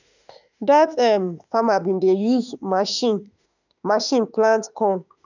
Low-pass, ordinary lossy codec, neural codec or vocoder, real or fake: 7.2 kHz; none; autoencoder, 48 kHz, 32 numbers a frame, DAC-VAE, trained on Japanese speech; fake